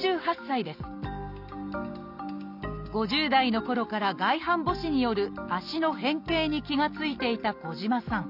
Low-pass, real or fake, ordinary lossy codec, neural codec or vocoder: 5.4 kHz; real; none; none